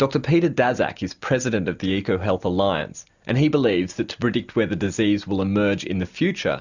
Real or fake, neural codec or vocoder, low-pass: real; none; 7.2 kHz